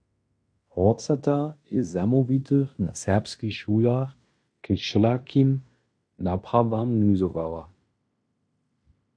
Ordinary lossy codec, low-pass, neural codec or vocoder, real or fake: MP3, 64 kbps; 9.9 kHz; codec, 16 kHz in and 24 kHz out, 0.9 kbps, LongCat-Audio-Codec, fine tuned four codebook decoder; fake